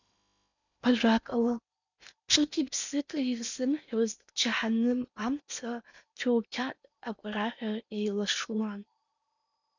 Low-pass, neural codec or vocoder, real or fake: 7.2 kHz; codec, 16 kHz in and 24 kHz out, 0.8 kbps, FocalCodec, streaming, 65536 codes; fake